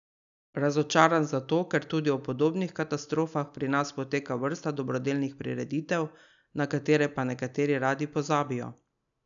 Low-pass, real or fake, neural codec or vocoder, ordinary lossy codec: 7.2 kHz; real; none; none